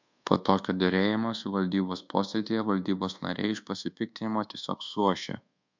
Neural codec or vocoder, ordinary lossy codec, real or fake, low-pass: codec, 24 kHz, 1.2 kbps, DualCodec; MP3, 64 kbps; fake; 7.2 kHz